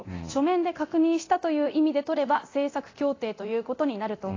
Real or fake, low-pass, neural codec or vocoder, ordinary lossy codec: fake; 7.2 kHz; codec, 24 kHz, 0.9 kbps, DualCodec; AAC, 32 kbps